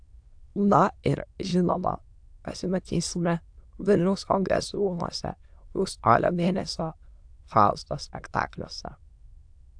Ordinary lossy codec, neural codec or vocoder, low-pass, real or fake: AAC, 64 kbps; autoencoder, 22.05 kHz, a latent of 192 numbers a frame, VITS, trained on many speakers; 9.9 kHz; fake